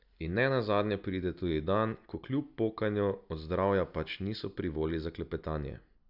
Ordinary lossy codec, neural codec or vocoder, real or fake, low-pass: none; none; real; 5.4 kHz